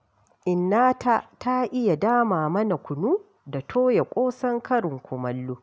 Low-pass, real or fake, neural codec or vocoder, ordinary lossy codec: none; real; none; none